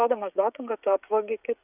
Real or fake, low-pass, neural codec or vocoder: fake; 3.6 kHz; codec, 16 kHz, 16 kbps, FreqCodec, smaller model